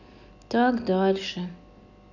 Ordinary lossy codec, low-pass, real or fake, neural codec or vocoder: none; 7.2 kHz; real; none